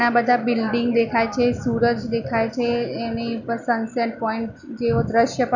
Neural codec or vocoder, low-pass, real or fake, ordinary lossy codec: none; 7.2 kHz; real; none